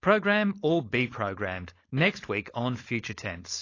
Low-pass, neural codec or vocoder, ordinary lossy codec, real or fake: 7.2 kHz; codec, 16 kHz, 4.8 kbps, FACodec; AAC, 32 kbps; fake